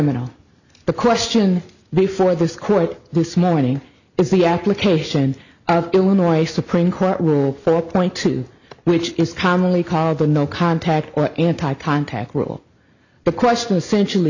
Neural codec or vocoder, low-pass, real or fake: none; 7.2 kHz; real